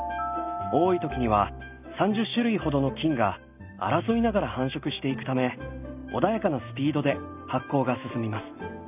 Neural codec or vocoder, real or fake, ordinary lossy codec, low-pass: none; real; none; 3.6 kHz